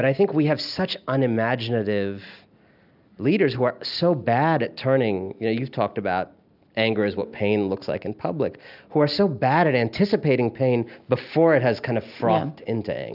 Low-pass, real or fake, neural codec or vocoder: 5.4 kHz; real; none